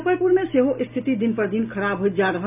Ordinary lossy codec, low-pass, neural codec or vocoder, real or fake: none; 3.6 kHz; none; real